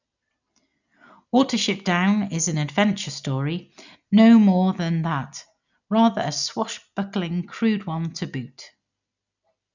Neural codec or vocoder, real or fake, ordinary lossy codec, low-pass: none; real; none; 7.2 kHz